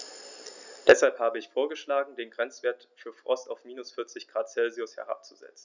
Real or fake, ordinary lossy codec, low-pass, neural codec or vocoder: real; none; 7.2 kHz; none